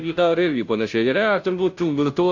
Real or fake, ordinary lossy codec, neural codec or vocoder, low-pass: fake; AAC, 48 kbps; codec, 16 kHz, 0.5 kbps, FunCodec, trained on Chinese and English, 25 frames a second; 7.2 kHz